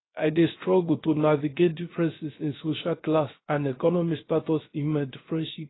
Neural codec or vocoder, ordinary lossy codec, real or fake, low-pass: codec, 16 kHz, 0.3 kbps, FocalCodec; AAC, 16 kbps; fake; 7.2 kHz